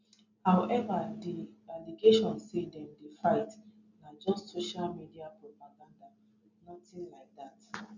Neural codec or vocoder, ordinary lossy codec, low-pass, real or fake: none; none; 7.2 kHz; real